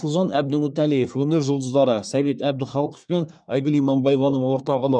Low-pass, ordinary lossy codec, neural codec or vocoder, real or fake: 9.9 kHz; none; codec, 24 kHz, 1 kbps, SNAC; fake